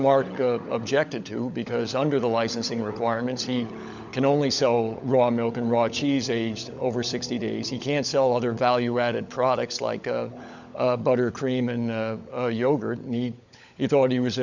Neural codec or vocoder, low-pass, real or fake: codec, 16 kHz, 16 kbps, FunCodec, trained on LibriTTS, 50 frames a second; 7.2 kHz; fake